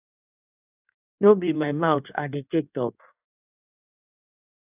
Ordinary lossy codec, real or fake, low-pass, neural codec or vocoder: AAC, 32 kbps; fake; 3.6 kHz; codec, 16 kHz in and 24 kHz out, 1.1 kbps, FireRedTTS-2 codec